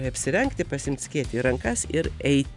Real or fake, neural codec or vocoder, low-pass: real; none; 10.8 kHz